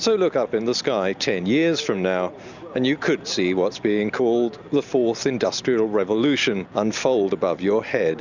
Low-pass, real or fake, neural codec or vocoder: 7.2 kHz; real; none